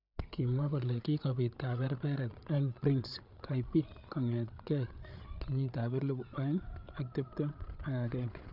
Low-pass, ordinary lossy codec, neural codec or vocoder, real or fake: 5.4 kHz; none; codec, 16 kHz, 8 kbps, FreqCodec, larger model; fake